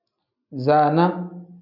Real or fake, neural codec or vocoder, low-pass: real; none; 5.4 kHz